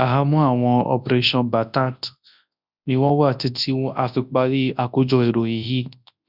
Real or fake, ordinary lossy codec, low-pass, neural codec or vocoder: fake; none; 5.4 kHz; codec, 24 kHz, 0.9 kbps, WavTokenizer, large speech release